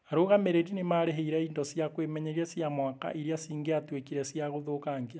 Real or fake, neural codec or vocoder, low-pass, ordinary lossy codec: real; none; none; none